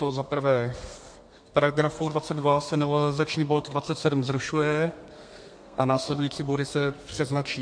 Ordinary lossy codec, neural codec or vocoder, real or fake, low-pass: MP3, 48 kbps; codec, 32 kHz, 1.9 kbps, SNAC; fake; 9.9 kHz